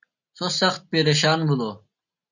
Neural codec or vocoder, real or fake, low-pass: none; real; 7.2 kHz